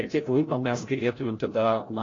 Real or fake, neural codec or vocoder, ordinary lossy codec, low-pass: fake; codec, 16 kHz, 0.5 kbps, FreqCodec, larger model; AAC, 32 kbps; 7.2 kHz